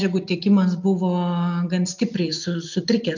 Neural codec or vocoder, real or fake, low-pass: none; real; 7.2 kHz